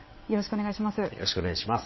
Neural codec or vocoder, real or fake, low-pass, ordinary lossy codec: vocoder, 22.05 kHz, 80 mel bands, WaveNeXt; fake; 7.2 kHz; MP3, 24 kbps